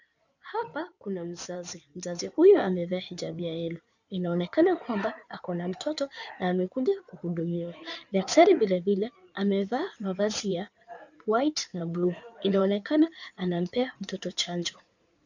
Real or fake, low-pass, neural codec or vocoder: fake; 7.2 kHz; codec, 16 kHz in and 24 kHz out, 2.2 kbps, FireRedTTS-2 codec